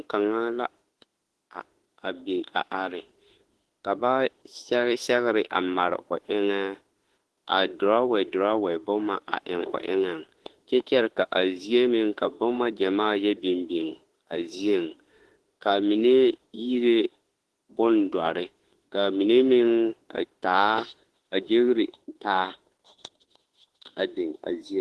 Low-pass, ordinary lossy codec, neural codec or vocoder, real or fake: 10.8 kHz; Opus, 16 kbps; autoencoder, 48 kHz, 32 numbers a frame, DAC-VAE, trained on Japanese speech; fake